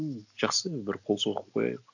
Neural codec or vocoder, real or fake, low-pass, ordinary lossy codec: none; real; 7.2 kHz; none